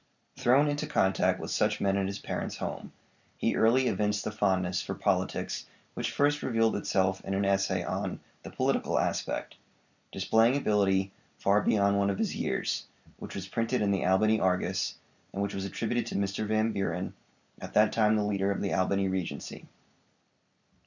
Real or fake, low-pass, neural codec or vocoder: real; 7.2 kHz; none